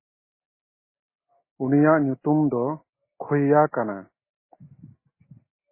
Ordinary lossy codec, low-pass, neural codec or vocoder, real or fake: MP3, 16 kbps; 3.6 kHz; none; real